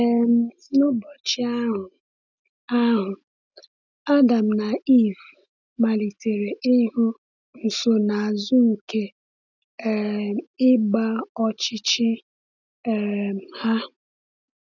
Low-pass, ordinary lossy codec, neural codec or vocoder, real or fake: 7.2 kHz; none; none; real